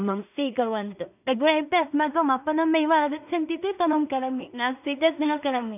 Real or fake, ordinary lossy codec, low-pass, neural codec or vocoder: fake; none; 3.6 kHz; codec, 16 kHz in and 24 kHz out, 0.4 kbps, LongCat-Audio-Codec, two codebook decoder